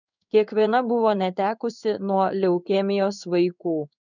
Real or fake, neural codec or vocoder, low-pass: fake; codec, 16 kHz in and 24 kHz out, 1 kbps, XY-Tokenizer; 7.2 kHz